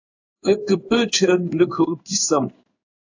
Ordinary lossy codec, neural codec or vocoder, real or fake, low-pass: AAC, 48 kbps; codec, 16 kHz in and 24 kHz out, 1 kbps, XY-Tokenizer; fake; 7.2 kHz